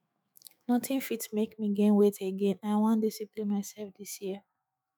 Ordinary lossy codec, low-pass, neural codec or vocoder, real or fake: none; none; autoencoder, 48 kHz, 128 numbers a frame, DAC-VAE, trained on Japanese speech; fake